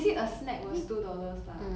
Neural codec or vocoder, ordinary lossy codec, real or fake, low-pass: none; none; real; none